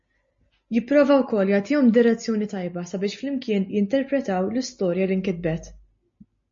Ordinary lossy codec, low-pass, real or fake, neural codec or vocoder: MP3, 32 kbps; 7.2 kHz; real; none